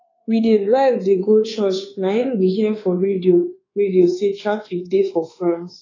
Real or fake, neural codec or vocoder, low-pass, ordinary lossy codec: fake; codec, 24 kHz, 1.2 kbps, DualCodec; 7.2 kHz; AAC, 32 kbps